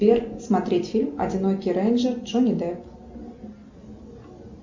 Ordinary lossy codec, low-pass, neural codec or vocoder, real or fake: MP3, 64 kbps; 7.2 kHz; none; real